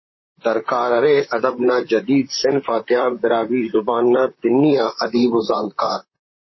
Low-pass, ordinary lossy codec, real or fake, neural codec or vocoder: 7.2 kHz; MP3, 24 kbps; fake; vocoder, 44.1 kHz, 128 mel bands, Pupu-Vocoder